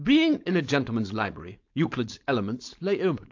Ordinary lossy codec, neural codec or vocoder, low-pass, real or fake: AAC, 48 kbps; codec, 16 kHz, 4.8 kbps, FACodec; 7.2 kHz; fake